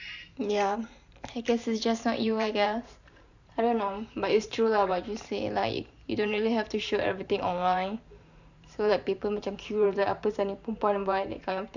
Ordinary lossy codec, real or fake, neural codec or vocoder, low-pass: none; fake; vocoder, 44.1 kHz, 128 mel bands every 512 samples, BigVGAN v2; 7.2 kHz